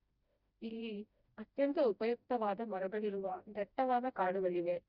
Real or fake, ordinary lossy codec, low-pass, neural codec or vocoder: fake; Opus, 64 kbps; 5.4 kHz; codec, 16 kHz, 1 kbps, FreqCodec, smaller model